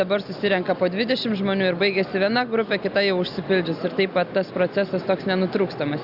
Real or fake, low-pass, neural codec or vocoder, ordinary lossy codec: real; 5.4 kHz; none; Opus, 64 kbps